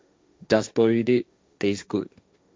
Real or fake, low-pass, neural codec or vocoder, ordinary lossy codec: fake; none; codec, 16 kHz, 1.1 kbps, Voila-Tokenizer; none